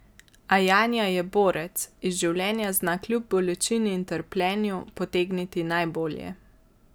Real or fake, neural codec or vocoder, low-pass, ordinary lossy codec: real; none; none; none